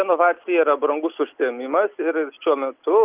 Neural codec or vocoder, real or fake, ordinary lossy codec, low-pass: none; real; Opus, 24 kbps; 3.6 kHz